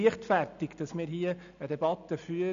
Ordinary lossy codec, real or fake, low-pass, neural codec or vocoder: none; real; 7.2 kHz; none